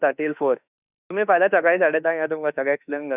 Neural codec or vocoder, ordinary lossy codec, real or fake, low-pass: autoencoder, 48 kHz, 32 numbers a frame, DAC-VAE, trained on Japanese speech; none; fake; 3.6 kHz